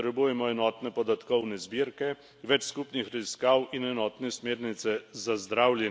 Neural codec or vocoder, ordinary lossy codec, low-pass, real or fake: none; none; none; real